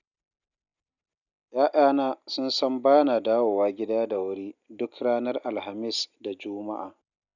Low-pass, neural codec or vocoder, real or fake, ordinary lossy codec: 7.2 kHz; none; real; none